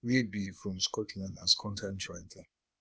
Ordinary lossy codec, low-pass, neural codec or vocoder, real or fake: none; none; codec, 16 kHz, 0.8 kbps, ZipCodec; fake